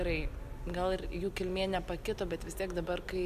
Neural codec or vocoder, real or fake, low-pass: none; real; 14.4 kHz